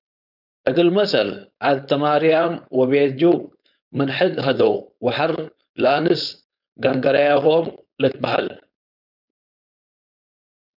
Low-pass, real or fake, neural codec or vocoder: 5.4 kHz; fake; codec, 16 kHz, 4.8 kbps, FACodec